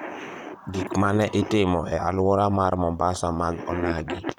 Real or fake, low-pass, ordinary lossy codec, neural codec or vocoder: fake; 19.8 kHz; none; vocoder, 44.1 kHz, 128 mel bands, Pupu-Vocoder